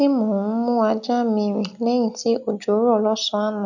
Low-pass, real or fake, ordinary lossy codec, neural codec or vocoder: 7.2 kHz; real; none; none